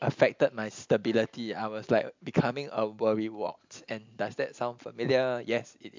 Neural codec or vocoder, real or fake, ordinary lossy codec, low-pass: none; real; MP3, 64 kbps; 7.2 kHz